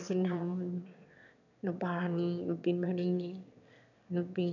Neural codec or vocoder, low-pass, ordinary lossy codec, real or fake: autoencoder, 22.05 kHz, a latent of 192 numbers a frame, VITS, trained on one speaker; 7.2 kHz; none; fake